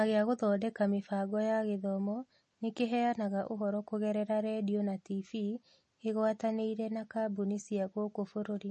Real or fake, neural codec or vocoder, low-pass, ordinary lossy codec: real; none; 10.8 kHz; MP3, 32 kbps